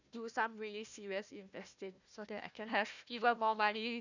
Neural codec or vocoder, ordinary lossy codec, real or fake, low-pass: codec, 16 kHz, 1 kbps, FunCodec, trained on Chinese and English, 50 frames a second; none; fake; 7.2 kHz